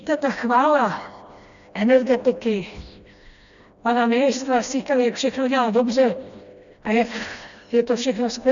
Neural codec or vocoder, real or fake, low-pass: codec, 16 kHz, 1 kbps, FreqCodec, smaller model; fake; 7.2 kHz